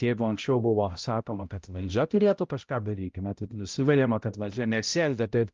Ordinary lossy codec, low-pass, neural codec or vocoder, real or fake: Opus, 32 kbps; 7.2 kHz; codec, 16 kHz, 0.5 kbps, X-Codec, HuBERT features, trained on balanced general audio; fake